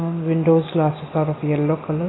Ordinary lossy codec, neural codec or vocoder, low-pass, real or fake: AAC, 16 kbps; none; 7.2 kHz; real